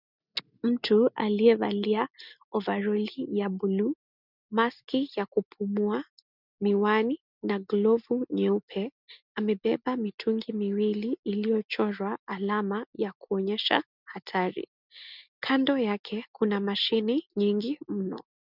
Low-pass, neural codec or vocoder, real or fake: 5.4 kHz; none; real